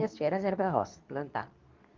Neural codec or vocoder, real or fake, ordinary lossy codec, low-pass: codec, 24 kHz, 0.9 kbps, WavTokenizer, medium speech release version 2; fake; Opus, 32 kbps; 7.2 kHz